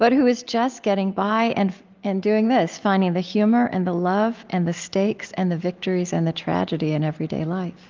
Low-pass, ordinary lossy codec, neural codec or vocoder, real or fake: 7.2 kHz; Opus, 32 kbps; none; real